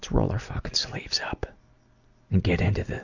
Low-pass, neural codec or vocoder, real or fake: 7.2 kHz; none; real